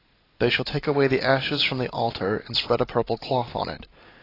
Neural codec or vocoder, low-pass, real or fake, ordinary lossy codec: none; 5.4 kHz; real; AAC, 24 kbps